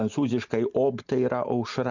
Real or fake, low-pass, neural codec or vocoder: real; 7.2 kHz; none